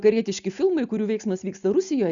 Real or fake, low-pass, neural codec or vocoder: real; 7.2 kHz; none